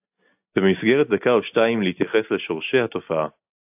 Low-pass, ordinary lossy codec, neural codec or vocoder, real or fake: 3.6 kHz; AAC, 32 kbps; none; real